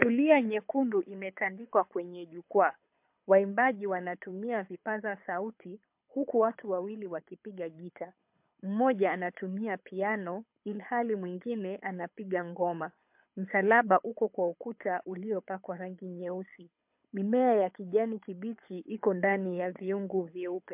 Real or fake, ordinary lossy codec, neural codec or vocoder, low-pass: fake; MP3, 32 kbps; codec, 24 kHz, 6 kbps, HILCodec; 3.6 kHz